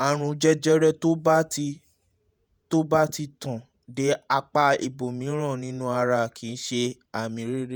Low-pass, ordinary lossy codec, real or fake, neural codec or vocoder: none; none; fake; vocoder, 48 kHz, 128 mel bands, Vocos